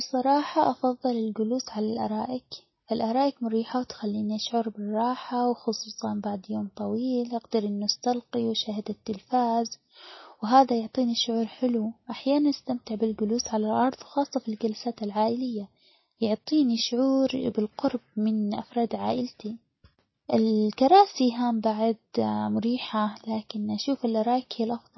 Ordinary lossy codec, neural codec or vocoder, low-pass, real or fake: MP3, 24 kbps; none; 7.2 kHz; real